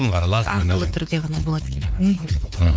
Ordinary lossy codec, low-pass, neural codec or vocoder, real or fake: none; none; codec, 16 kHz, 4 kbps, X-Codec, WavLM features, trained on Multilingual LibriSpeech; fake